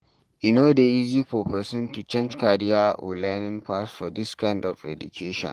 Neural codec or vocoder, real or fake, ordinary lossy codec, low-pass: codec, 44.1 kHz, 3.4 kbps, Pupu-Codec; fake; Opus, 24 kbps; 14.4 kHz